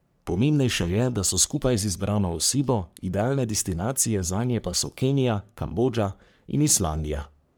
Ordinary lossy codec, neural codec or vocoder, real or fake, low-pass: none; codec, 44.1 kHz, 3.4 kbps, Pupu-Codec; fake; none